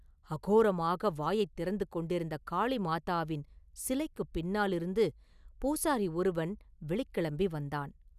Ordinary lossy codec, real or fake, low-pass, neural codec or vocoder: none; real; 14.4 kHz; none